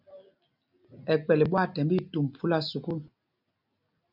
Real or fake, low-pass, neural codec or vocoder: real; 5.4 kHz; none